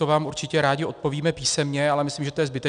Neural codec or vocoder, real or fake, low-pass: none; real; 9.9 kHz